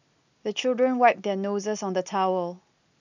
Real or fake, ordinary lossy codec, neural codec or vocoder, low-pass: real; none; none; 7.2 kHz